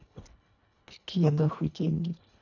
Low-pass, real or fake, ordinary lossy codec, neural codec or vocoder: 7.2 kHz; fake; none; codec, 24 kHz, 1.5 kbps, HILCodec